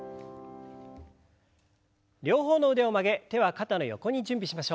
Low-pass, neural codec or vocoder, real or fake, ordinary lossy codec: none; none; real; none